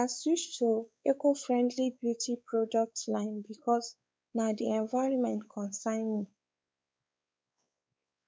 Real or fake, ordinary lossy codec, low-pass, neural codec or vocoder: fake; none; none; codec, 16 kHz, 4 kbps, X-Codec, WavLM features, trained on Multilingual LibriSpeech